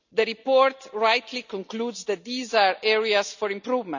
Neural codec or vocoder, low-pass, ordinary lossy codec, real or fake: none; 7.2 kHz; none; real